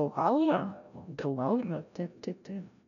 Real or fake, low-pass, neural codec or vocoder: fake; 7.2 kHz; codec, 16 kHz, 0.5 kbps, FreqCodec, larger model